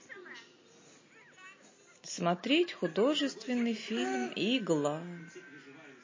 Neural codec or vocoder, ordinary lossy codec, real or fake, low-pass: none; MP3, 32 kbps; real; 7.2 kHz